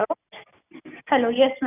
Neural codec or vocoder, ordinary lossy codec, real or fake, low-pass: none; none; real; 3.6 kHz